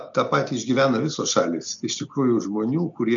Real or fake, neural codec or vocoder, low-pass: real; none; 7.2 kHz